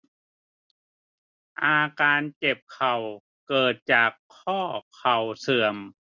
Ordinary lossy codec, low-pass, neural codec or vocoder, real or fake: none; 7.2 kHz; none; real